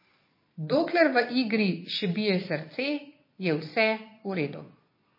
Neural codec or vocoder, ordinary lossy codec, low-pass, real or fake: vocoder, 22.05 kHz, 80 mel bands, Vocos; MP3, 24 kbps; 5.4 kHz; fake